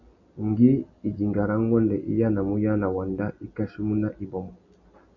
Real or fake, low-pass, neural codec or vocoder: real; 7.2 kHz; none